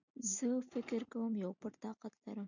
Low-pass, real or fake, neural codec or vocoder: 7.2 kHz; real; none